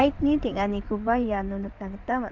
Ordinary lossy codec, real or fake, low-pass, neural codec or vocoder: Opus, 24 kbps; fake; 7.2 kHz; codec, 16 kHz in and 24 kHz out, 2.2 kbps, FireRedTTS-2 codec